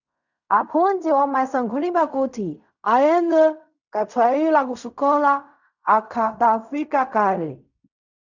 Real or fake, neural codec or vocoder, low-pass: fake; codec, 16 kHz in and 24 kHz out, 0.4 kbps, LongCat-Audio-Codec, fine tuned four codebook decoder; 7.2 kHz